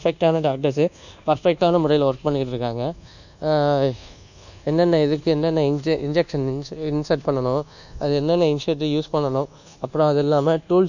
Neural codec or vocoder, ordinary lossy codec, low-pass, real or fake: codec, 24 kHz, 1.2 kbps, DualCodec; none; 7.2 kHz; fake